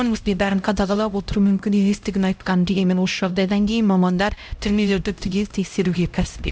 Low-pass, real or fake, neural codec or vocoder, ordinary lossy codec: none; fake; codec, 16 kHz, 0.5 kbps, X-Codec, HuBERT features, trained on LibriSpeech; none